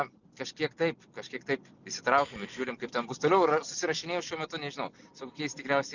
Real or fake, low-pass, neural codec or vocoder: real; 7.2 kHz; none